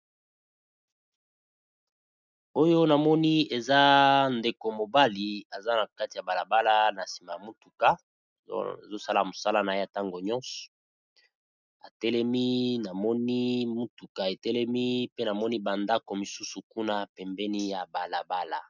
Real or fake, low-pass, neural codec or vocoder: real; 7.2 kHz; none